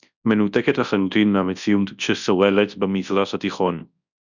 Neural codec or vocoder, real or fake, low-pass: codec, 24 kHz, 0.9 kbps, WavTokenizer, large speech release; fake; 7.2 kHz